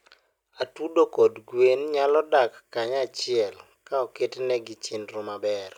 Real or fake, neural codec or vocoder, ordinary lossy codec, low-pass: real; none; none; 19.8 kHz